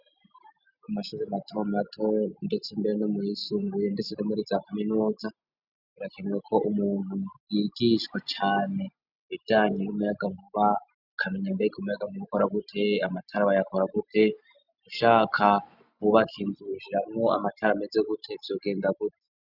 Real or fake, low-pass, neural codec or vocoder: real; 5.4 kHz; none